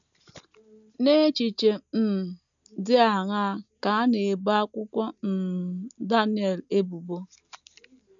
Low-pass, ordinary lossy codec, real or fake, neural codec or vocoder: 7.2 kHz; none; real; none